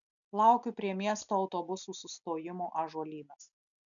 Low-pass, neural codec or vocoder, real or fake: 7.2 kHz; none; real